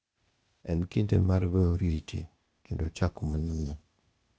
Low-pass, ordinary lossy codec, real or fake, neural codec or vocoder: none; none; fake; codec, 16 kHz, 0.8 kbps, ZipCodec